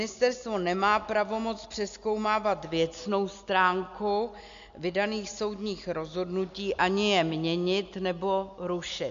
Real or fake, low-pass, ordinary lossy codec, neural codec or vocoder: real; 7.2 kHz; MP3, 64 kbps; none